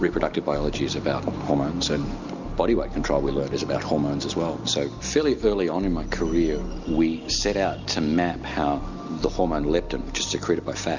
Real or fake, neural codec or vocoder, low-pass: real; none; 7.2 kHz